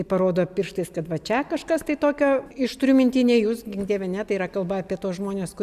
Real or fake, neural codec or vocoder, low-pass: real; none; 14.4 kHz